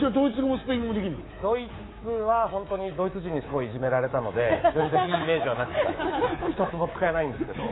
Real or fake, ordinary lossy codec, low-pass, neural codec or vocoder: fake; AAC, 16 kbps; 7.2 kHz; codec, 24 kHz, 3.1 kbps, DualCodec